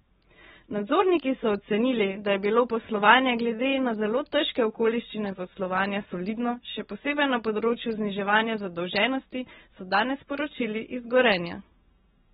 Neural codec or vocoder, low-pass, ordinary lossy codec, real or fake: none; 19.8 kHz; AAC, 16 kbps; real